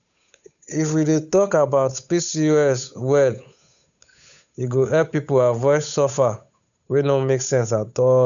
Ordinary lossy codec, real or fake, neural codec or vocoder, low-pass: none; fake; codec, 16 kHz, 8 kbps, FunCodec, trained on Chinese and English, 25 frames a second; 7.2 kHz